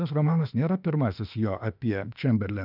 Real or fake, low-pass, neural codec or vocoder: fake; 5.4 kHz; autoencoder, 48 kHz, 32 numbers a frame, DAC-VAE, trained on Japanese speech